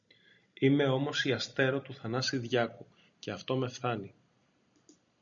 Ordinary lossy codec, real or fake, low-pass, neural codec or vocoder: MP3, 96 kbps; real; 7.2 kHz; none